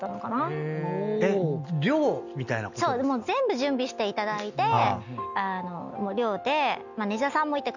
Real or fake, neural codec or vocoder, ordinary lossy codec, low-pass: real; none; none; 7.2 kHz